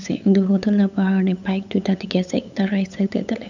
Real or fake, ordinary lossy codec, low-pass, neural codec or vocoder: fake; none; 7.2 kHz; codec, 16 kHz, 8 kbps, FunCodec, trained on LibriTTS, 25 frames a second